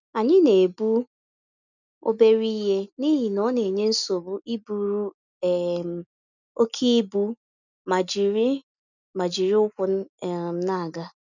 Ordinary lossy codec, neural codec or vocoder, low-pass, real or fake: none; none; 7.2 kHz; real